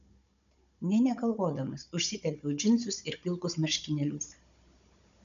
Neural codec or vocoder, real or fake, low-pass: codec, 16 kHz, 16 kbps, FunCodec, trained on Chinese and English, 50 frames a second; fake; 7.2 kHz